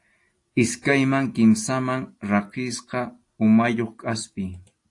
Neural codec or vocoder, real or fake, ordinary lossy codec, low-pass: none; real; AAC, 48 kbps; 10.8 kHz